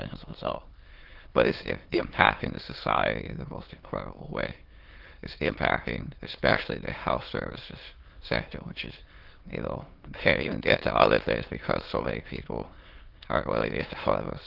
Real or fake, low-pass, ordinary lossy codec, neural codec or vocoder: fake; 5.4 kHz; Opus, 16 kbps; autoencoder, 22.05 kHz, a latent of 192 numbers a frame, VITS, trained on many speakers